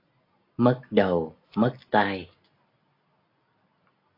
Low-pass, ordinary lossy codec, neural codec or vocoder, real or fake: 5.4 kHz; AAC, 32 kbps; none; real